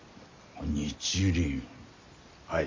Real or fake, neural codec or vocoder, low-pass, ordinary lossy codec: real; none; 7.2 kHz; MP3, 48 kbps